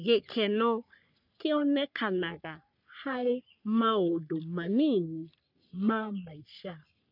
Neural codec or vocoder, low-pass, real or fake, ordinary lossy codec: codec, 44.1 kHz, 3.4 kbps, Pupu-Codec; 5.4 kHz; fake; none